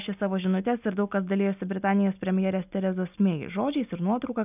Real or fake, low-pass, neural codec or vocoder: real; 3.6 kHz; none